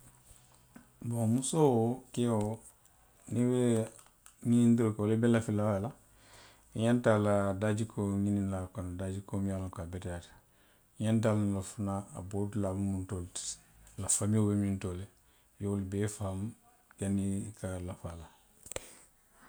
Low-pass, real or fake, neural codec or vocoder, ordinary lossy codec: none; real; none; none